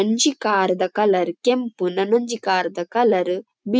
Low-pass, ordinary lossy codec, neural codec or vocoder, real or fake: none; none; none; real